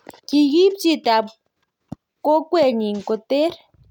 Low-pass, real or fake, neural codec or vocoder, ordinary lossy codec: 19.8 kHz; real; none; none